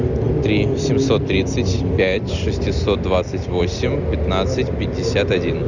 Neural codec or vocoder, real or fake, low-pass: none; real; 7.2 kHz